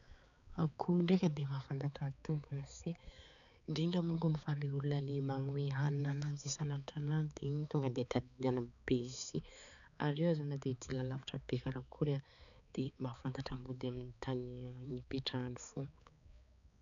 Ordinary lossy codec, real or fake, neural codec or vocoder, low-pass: none; fake; codec, 16 kHz, 4 kbps, X-Codec, HuBERT features, trained on balanced general audio; 7.2 kHz